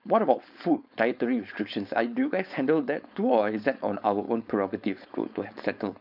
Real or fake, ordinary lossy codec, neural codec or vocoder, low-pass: fake; none; codec, 16 kHz, 4.8 kbps, FACodec; 5.4 kHz